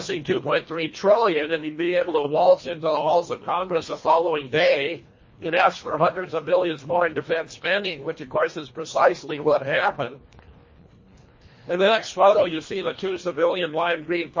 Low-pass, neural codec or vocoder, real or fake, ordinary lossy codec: 7.2 kHz; codec, 24 kHz, 1.5 kbps, HILCodec; fake; MP3, 32 kbps